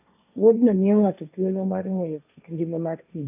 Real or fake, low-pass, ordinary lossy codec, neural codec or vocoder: fake; 3.6 kHz; none; codec, 16 kHz, 1.1 kbps, Voila-Tokenizer